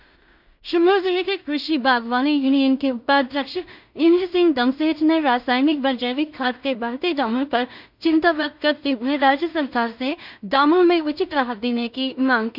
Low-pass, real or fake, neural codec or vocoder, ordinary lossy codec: 5.4 kHz; fake; codec, 16 kHz in and 24 kHz out, 0.4 kbps, LongCat-Audio-Codec, two codebook decoder; none